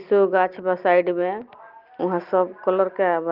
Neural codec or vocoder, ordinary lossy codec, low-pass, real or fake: none; Opus, 32 kbps; 5.4 kHz; real